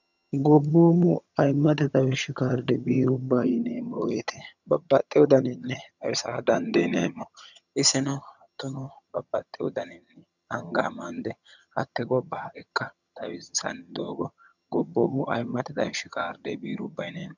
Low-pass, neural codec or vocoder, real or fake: 7.2 kHz; vocoder, 22.05 kHz, 80 mel bands, HiFi-GAN; fake